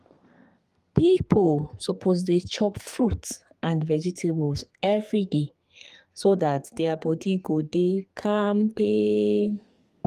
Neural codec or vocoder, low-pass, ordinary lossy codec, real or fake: codec, 32 kHz, 1.9 kbps, SNAC; 14.4 kHz; Opus, 32 kbps; fake